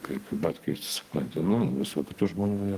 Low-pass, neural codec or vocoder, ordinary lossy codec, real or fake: 14.4 kHz; codec, 32 kHz, 1.9 kbps, SNAC; Opus, 24 kbps; fake